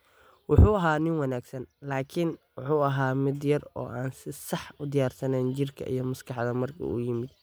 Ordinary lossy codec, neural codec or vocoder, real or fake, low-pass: none; none; real; none